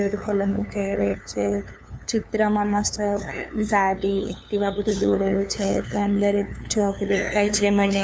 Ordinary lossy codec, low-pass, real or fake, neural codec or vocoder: none; none; fake; codec, 16 kHz, 2 kbps, FunCodec, trained on LibriTTS, 25 frames a second